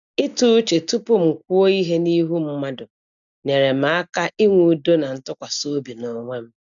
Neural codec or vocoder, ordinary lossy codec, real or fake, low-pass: none; none; real; 7.2 kHz